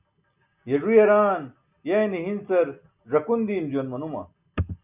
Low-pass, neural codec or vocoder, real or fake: 3.6 kHz; none; real